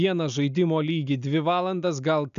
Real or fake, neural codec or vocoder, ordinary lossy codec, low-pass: real; none; MP3, 96 kbps; 7.2 kHz